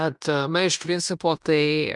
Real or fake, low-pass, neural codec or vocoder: fake; 10.8 kHz; codec, 16 kHz in and 24 kHz out, 0.9 kbps, LongCat-Audio-Codec, fine tuned four codebook decoder